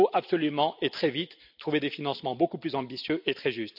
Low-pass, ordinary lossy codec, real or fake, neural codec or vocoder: 5.4 kHz; none; real; none